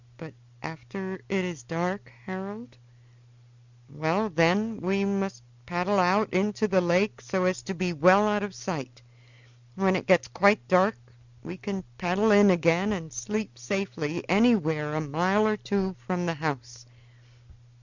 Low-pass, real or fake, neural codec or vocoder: 7.2 kHz; real; none